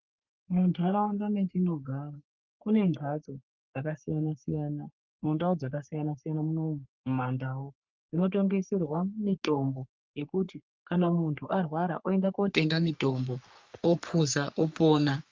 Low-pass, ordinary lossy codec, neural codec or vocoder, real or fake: 7.2 kHz; Opus, 24 kbps; codec, 44.1 kHz, 3.4 kbps, Pupu-Codec; fake